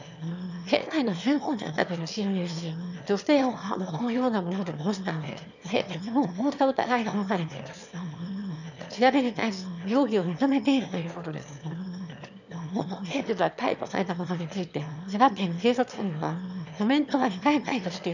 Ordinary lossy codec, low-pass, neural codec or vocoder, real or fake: none; 7.2 kHz; autoencoder, 22.05 kHz, a latent of 192 numbers a frame, VITS, trained on one speaker; fake